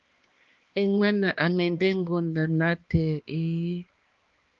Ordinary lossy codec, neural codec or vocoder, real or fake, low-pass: Opus, 32 kbps; codec, 16 kHz, 2 kbps, X-Codec, HuBERT features, trained on balanced general audio; fake; 7.2 kHz